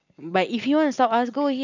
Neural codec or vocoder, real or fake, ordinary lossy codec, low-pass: none; real; none; 7.2 kHz